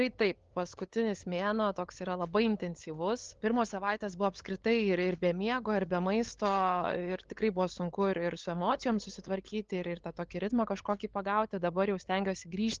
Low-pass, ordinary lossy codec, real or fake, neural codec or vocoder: 7.2 kHz; Opus, 16 kbps; fake; codec, 16 kHz, 8 kbps, FunCodec, trained on LibriTTS, 25 frames a second